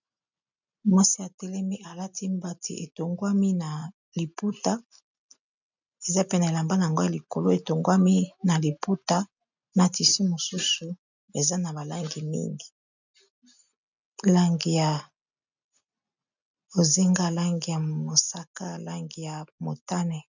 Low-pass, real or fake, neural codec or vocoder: 7.2 kHz; real; none